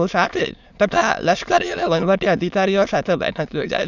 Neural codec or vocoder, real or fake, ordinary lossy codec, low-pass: autoencoder, 22.05 kHz, a latent of 192 numbers a frame, VITS, trained on many speakers; fake; none; 7.2 kHz